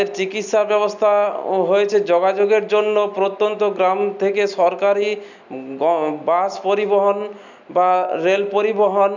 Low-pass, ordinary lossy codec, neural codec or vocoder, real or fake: 7.2 kHz; none; none; real